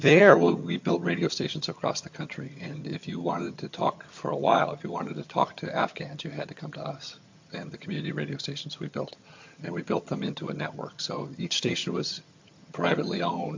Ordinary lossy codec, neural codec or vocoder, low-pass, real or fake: MP3, 48 kbps; vocoder, 22.05 kHz, 80 mel bands, HiFi-GAN; 7.2 kHz; fake